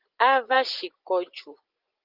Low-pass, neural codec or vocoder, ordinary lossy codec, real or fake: 5.4 kHz; vocoder, 24 kHz, 100 mel bands, Vocos; Opus, 32 kbps; fake